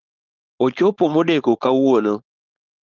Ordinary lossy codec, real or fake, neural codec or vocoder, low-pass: Opus, 32 kbps; fake; codec, 16 kHz, 4.8 kbps, FACodec; 7.2 kHz